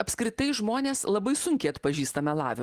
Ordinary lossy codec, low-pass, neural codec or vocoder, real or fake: Opus, 24 kbps; 14.4 kHz; none; real